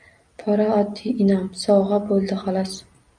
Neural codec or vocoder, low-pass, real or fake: none; 9.9 kHz; real